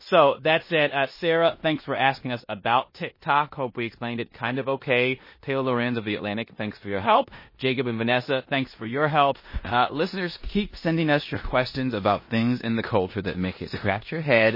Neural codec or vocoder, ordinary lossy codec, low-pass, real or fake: codec, 16 kHz in and 24 kHz out, 0.9 kbps, LongCat-Audio-Codec, four codebook decoder; MP3, 24 kbps; 5.4 kHz; fake